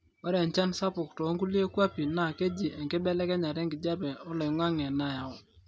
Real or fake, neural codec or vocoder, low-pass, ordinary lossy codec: real; none; none; none